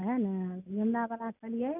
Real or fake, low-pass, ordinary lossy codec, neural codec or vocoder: real; 3.6 kHz; none; none